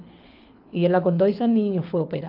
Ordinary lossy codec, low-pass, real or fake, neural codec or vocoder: AAC, 32 kbps; 5.4 kHz; fake; codec, 24 kHz, 6 kbps, HILCodec